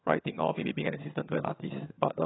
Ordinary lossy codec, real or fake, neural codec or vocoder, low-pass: AAC, 16 kbps; fake; vocoder, 22.05 kHz, 80 mel bands, HiFi-GAN; 7.2 kHz